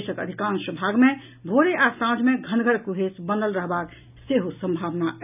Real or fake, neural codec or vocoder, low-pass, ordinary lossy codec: real; none; 3.6 kHz; none